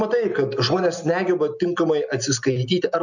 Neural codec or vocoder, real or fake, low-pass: none; real; 7.2 kHz